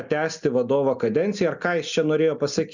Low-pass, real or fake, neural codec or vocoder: 7.2 kHz; real; none